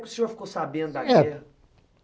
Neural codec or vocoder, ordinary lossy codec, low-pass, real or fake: none; none; none; real